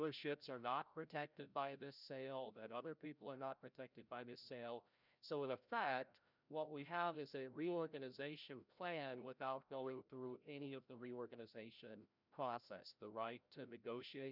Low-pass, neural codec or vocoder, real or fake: 5.4 kHz; codec, 16 kHz, 0.5 kbps, FreqCodec, larger model; fake